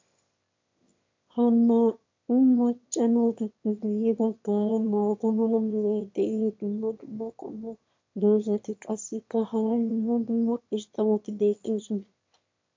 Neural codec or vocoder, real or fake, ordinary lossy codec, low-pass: autoencoder, 22.05 kHz, a latent of 192 numbers a frame, VITS, trained on one speaker; fake; MP3, 48 kbps; 7.2 kHz